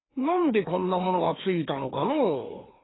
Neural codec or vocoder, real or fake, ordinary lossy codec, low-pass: codec, 16 kHz, 2 kbps, FreqCodec, larger model; fake; AAC, 16 kbps; 7.2 kHz